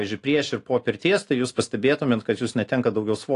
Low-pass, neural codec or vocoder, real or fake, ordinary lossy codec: 10.8 kHz; none; real; AAC, 48 kbps